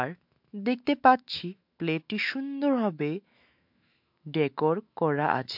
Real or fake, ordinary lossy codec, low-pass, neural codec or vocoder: fake; MP3, 48 kbps; 5.4 kHz; autoencoder, 48 kHz, 128 numbers a frame, DAC-VAE, trained on Japanese speech